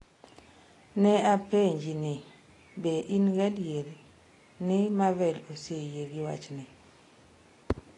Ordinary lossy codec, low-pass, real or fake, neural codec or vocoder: AAC, 32 kbps; 10.8 kHz; real; none